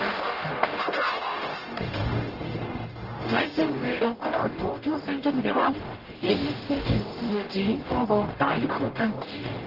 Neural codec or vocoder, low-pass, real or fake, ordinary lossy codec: codec, 44.1 kHz, 0.9 kbps, DAC; 5.4 kHz; fake; Opus, 16 kbps